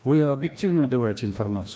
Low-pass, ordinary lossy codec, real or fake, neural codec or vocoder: none; none; fake; codec, 16 kHz, 1 kbps, FreqCodec, larger model